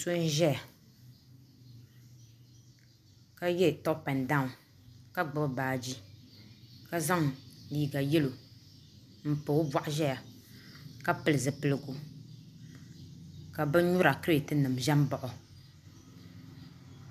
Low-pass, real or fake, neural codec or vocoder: 14.4 kHz; real; none